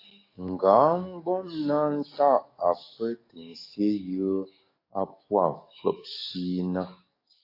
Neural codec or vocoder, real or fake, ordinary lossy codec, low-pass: codec, 44.1 kHz, 7.8 kbps, DAC; fake; AAC, 32 kbps; 5.4 kHz